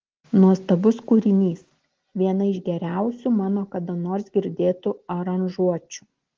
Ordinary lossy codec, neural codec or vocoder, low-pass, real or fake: Opus, 16 kbps; none; 7.2 kHz; real